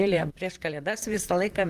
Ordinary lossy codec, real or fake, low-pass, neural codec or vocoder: Opus, 24 kbps; fake; 14.4 kHz; vocoder, 44.1 kHz, 128 mel bands, Pupu-Vocoder